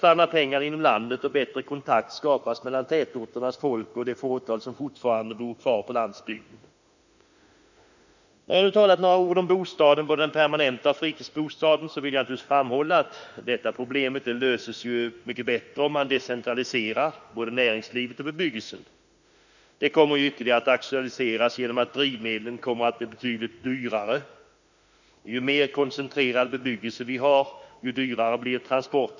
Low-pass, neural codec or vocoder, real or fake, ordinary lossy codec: 7.2 kHz; autoencoder, 48 kHz, 32 numbers a frame, DAC-VAE, trained on Japanese speech; fake; none